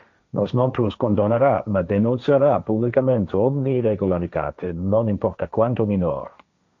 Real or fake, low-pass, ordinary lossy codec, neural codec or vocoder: fake; 7.2 kHz; MP3, 64 kbps; codec, 16 kHz, 1.1 kbps, Voila-Tokenizer